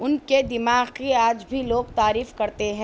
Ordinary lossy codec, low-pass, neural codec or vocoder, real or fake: none; none; none; real